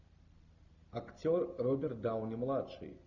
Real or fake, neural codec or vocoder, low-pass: real; none; 7.2 kHz